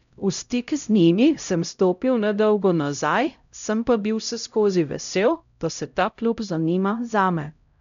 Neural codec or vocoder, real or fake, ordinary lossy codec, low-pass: codec, 16 kHz, 0.5 kbps, X-Codec, HuBERT features, trained on LibriSpeech; fake; none; 7.2 kHz